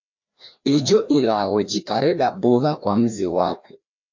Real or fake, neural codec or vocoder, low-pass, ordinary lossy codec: fake; codec, 16 kHz, 1 kbps, FreqCodec, larger model; 7.2 kHz; MP3, 48 kbps